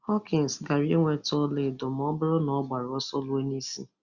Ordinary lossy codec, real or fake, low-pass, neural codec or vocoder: Opus, 64 kbps; real; 7.2 kHz; none